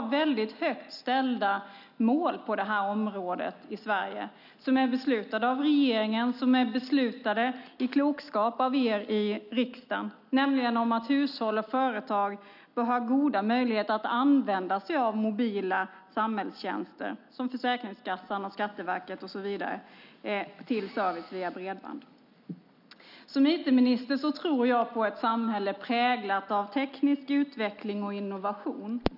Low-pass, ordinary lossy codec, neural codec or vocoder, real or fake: 5.4 kHz; none; none; real